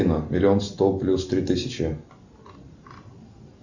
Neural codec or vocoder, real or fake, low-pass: none; real; 7.2 kHz